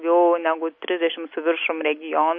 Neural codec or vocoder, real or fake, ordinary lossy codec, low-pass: none; real; MP3, 32 kbps; 7.2 kHz